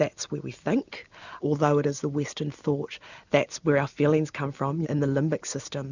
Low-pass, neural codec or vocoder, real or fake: 7.2 kHz; none; real